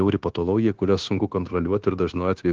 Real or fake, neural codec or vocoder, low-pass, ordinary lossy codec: fake; codec, 16 kHz, 0.9 kbps, LongCat-Audio-Codec; 7.2 kHz; Opus, 16 kbps